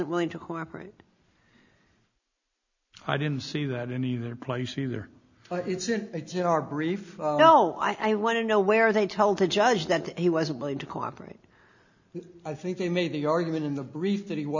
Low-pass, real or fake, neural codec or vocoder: 7.2 kHz; real; none